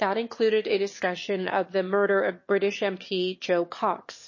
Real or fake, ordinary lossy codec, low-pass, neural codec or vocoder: fake; MP3, 32 kbps; 7.2 kHz; autoencoder, 22.05 kHz, a latent of 192 numbers a frame, VITS, trained on one speaker